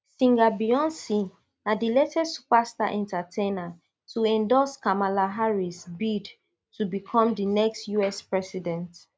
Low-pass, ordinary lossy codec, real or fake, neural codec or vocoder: none; none; real; none